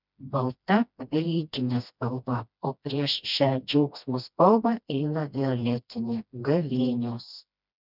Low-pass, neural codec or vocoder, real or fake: 5.4 kHz; codec, 16 kHz, 1 kbps, FreqCodec, smaller model; fake